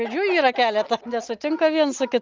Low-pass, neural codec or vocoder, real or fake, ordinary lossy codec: 7.2 kHz; none; real; Opus, 24 kbps